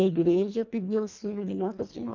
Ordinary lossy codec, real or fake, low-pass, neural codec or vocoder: none; fake; 7.2 kHz; codec, 24 kHz, 1.5 kbps, HILCodec